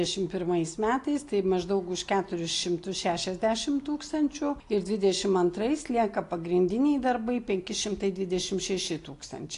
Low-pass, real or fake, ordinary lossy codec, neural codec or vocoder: 10.8 kHz; real; AAC, 48 kbps; none